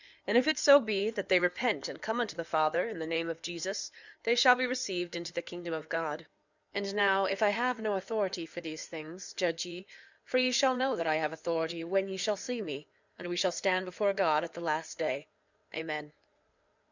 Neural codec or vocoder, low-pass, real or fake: codec, 16 kHz in and 24 kHz out, 2.2 kbps, FireRedTTS-2 codec; 7.2 kHz; fake